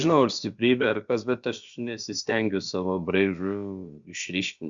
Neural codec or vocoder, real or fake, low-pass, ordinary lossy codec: codec, 16 kHz, about 1 kbps, DyCAST, with the encoder's durations; fake; 7.2 kHz; Opus, 64 kbps